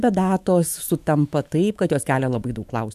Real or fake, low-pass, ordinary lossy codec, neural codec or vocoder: fake; 14.4 kHz; AAC, 96 kbps; autoencoder, 48 kHz, 128 numbers a frame, DAC-VAE, trained on Japanese speech